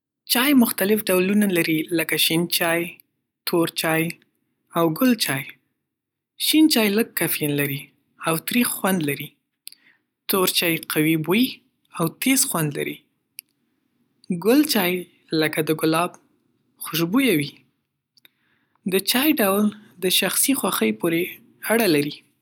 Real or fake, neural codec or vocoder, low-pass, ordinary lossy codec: real; none; 19.8 kHz; none